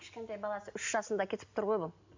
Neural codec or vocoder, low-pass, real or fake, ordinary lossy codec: none; 7.2 kHz; real; MP3, 64 kbps